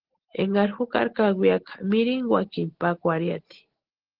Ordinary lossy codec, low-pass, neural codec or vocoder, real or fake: Opus, 16 kbps; 5.4 kHz; none; real